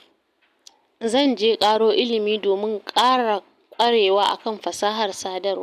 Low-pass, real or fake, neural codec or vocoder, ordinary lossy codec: 14.4 kHz; real; none; none